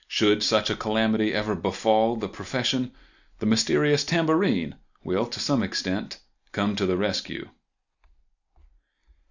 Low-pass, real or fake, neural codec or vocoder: 7.2 kHz; real; none